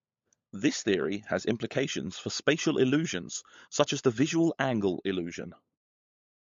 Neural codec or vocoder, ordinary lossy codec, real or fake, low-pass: codec, 16 kHz, 16 kbps, FunCodec, trained on LibriTTS, 50 frames a second; MP3, 48 kbps; fake; 7.2 kHz